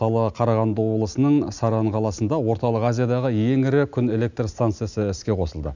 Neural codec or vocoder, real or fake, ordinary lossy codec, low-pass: none; real; none; 7.2 kHz